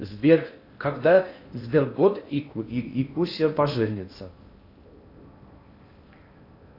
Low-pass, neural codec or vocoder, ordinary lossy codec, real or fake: 5.4 kHz; codec, 16 kHz in and 24 kHz out, 0.6 kbps, FocalCodec, streaming, 4096 codes; AAC, 32 kbps; fake